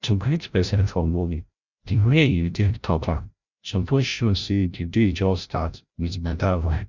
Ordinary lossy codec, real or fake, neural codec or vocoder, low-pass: none; fake; codec, 16 kHz, 0.5 kbps, FreqCodec, larger model; 7.2 kHz